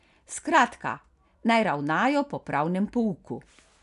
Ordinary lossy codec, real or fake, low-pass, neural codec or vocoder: none; real; 10.8 kHz; none